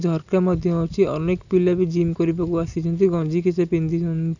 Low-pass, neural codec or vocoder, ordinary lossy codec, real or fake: 7.2 kHz; none; none; real